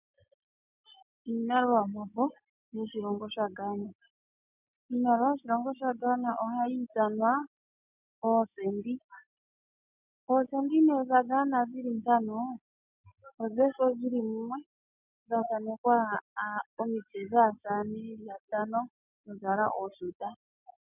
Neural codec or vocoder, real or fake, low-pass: none; real; 3.6 kHz